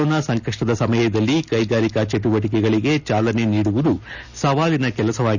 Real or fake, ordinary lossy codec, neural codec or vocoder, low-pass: real; none; none; 7.2 kHz